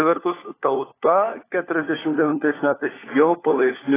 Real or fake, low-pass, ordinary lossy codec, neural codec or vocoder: fake; 3.6 kHz; AAC, 16 kbps; codec, 16 kHz, 4 kbps, FunCodec, trained on LibriTTS, 50 frames a second